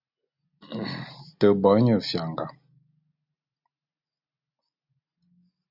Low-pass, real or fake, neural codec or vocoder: 5.4 kHz; real; none